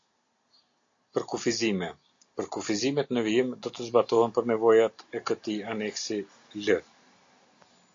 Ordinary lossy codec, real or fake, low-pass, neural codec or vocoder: AAC, 48 kbps; real; 7.2 kHz; none